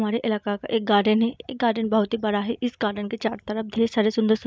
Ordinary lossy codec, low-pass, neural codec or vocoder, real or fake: none; none; none; real